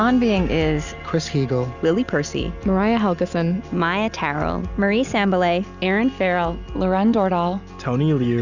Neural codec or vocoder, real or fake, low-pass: none; real; 7.2 kHz